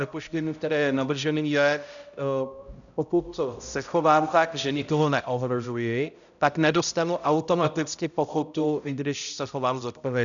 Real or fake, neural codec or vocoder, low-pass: fake; codec, 16 kHz, 0.5 kbps, X-Codec, HuBERT features, trained on balanced general audio; 7.2 kHz